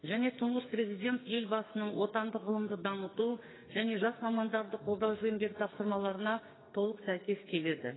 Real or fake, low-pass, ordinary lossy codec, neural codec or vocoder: fake; 7.2 kHz; AAC, 16 kbps; codec, 44.1 kHz, 2.6 kbps, SNAC